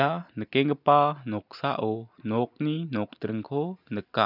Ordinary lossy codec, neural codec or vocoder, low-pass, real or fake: none; none; 5.4 kHz; real